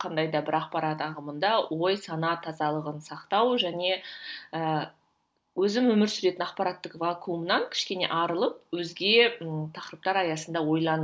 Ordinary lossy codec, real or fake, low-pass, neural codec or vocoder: none; real; none; none